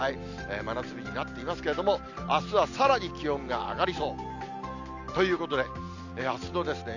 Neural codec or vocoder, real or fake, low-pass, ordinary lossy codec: none; real; 7.2 kHz; none